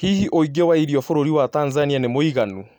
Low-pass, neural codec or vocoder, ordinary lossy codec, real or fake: 19.8 kHz; none; none; real